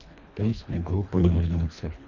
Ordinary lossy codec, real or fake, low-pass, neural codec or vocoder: none; fake; 7.2 kHz; codec, 24 kHz, 1.5 kbps, HILCodec